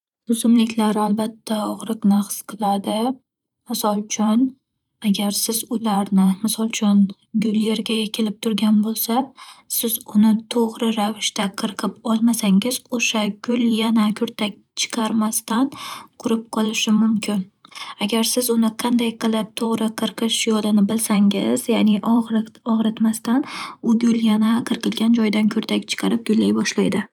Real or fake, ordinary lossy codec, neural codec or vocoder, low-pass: fake; none; vocoder, 44.1 kHz, 128 mel bands every 512 samples, BigVGAN v2; 19.8 kHz